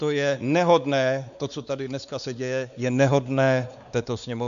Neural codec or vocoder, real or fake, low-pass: codec, 16 kHz, 4 kbps, X-Codec, WavLM features, trained on Multilingual LibriSpeech; fake; 7.2 kHz